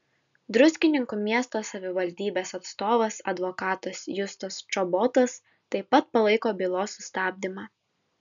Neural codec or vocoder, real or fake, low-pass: none; real; 7.2 kHz